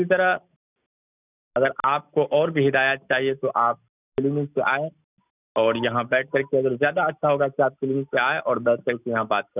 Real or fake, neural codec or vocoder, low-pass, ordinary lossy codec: real; none; 3.6 kHz; none